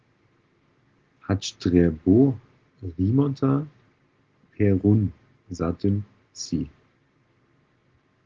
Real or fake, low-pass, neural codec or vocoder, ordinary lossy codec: real; 7.2 kHz; none; Opus, 16 kbps